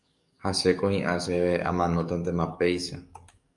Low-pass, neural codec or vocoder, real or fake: 10.8 kHz; codec, 44.1 kHz, 7.8 kbps, DAC; fake